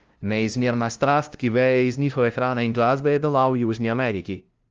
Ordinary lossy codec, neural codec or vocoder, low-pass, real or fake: Opus, 24 kbps; codec, 16 kHz, 0.5 kbps, FunCodec, trained on LibriTTS, 25 frames a second; 7.2 kHz; fake